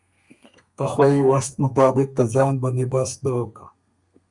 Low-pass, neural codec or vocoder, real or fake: 10.8 kHz; codec, 32 kHz, 1.9 kbps, SNAC; fake